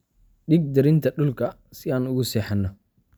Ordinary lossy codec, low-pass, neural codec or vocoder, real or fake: none; none; none; real